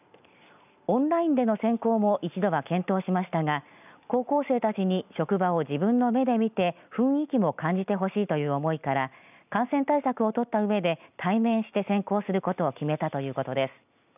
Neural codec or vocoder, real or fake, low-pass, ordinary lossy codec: none; real; 3.6 kHz; none